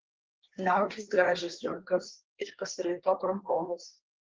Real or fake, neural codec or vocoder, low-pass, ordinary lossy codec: fake; codec, 24 kHz, 1 kbps, SNAC; 7.2 kHz; Opus, 16 kbps